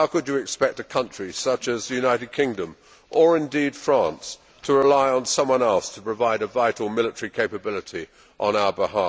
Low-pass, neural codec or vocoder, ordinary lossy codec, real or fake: none; none; none; real